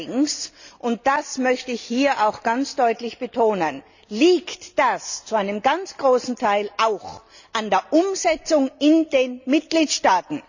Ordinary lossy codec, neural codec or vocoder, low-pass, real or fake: none; none; 7.2 kHz; real